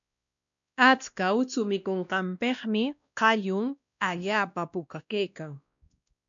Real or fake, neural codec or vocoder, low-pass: fake; codec, 16 kHz, 1 kbps, X-Codec, WavLM features, trained on Multilingual LibriSpeech; 7.2 kHz